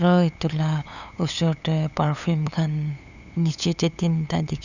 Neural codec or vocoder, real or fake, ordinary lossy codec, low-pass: codec, 16 kHz, 8 kbps, FunCodec, trained on LibriTTS, 25 frames a second; fake; none; 7.2 kHz